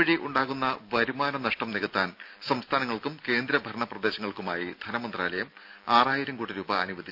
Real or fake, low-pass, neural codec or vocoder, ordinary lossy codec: real; 5.4 kHz; none; none